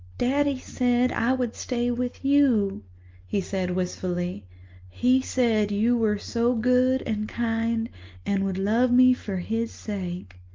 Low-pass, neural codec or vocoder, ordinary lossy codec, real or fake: 7.2 kHz; none; Opus, 24 kbps; real